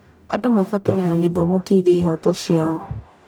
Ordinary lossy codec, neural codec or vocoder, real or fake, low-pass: none; codec, 44.1 kHz, 0.9 kbps, DAC; fake; none